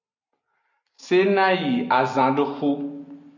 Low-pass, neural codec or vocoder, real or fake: 7.2 kHz; none; real